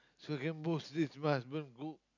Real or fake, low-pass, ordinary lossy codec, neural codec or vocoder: real; 7.2 kHz; none; none